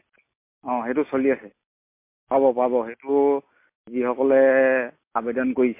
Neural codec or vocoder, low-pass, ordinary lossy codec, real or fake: none; 3.6 kHz; MP3, 24 kbps; real